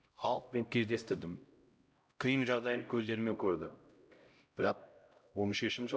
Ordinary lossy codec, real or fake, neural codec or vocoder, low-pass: none; fake; codec, 16 kHz, 0.5 kbps, X-Codec, HuBERT features, trained on LibriSpeech; none